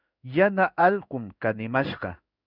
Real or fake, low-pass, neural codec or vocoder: fake; 5.4 kHz; codec, 16 kHz in and 24 kHz out, 1 kbps, XY-Tokenizer